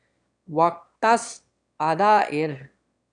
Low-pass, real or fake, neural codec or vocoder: 9.9 kHz; fake; autoencoder, 22.05 kHz, a latent of 192 numbers a frame, VITS, trained on one speaker